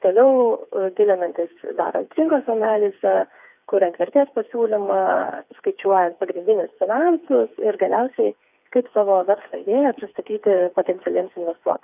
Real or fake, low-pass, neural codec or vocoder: fake; 3.6 kHz; codec, 16 kHz, 4 kbps, FreqCodec, smaller model